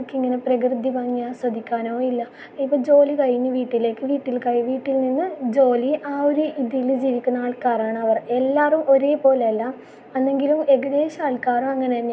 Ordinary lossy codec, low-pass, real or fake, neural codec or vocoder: none; none; real; none